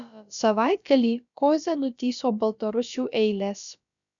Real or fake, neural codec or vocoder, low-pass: fake; codec, 16 kHz, about 1 kbps, DyCAST, with the encoder's durations; 7.2 kHz